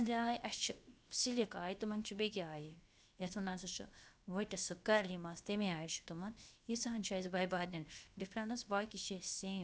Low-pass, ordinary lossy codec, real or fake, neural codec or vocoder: none; none; fake; codec, 16 kHz, 0.7 kbps, FocalCodec